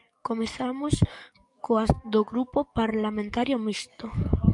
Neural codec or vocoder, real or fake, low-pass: codec, 44.1 kHz, 7.8 kbps, DAC; fake; 10.8 kHz